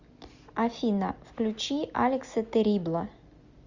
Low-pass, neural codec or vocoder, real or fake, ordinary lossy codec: 7.2 kHz; none; real; Opus, 64 kbps